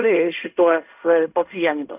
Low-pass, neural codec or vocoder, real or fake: 3.6 kHz; codec, 16 kHz in and 24 kHz out, 0.4 kbps, LongCat-Audio-Codec, fine tuned four codebook decoder; fake